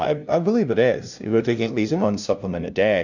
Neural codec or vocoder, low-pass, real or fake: codec, 16 kHz, 0.5 kbps, FunCodec, trained on LibriTTS, 25 frames a second; 7.2 kHz; fake